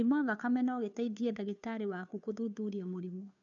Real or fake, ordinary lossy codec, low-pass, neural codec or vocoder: fake; none; 7.2 kHz; codec, 16 kHz, 2 kbps, FunCodec, trained on Chinese and English, 25 frames a second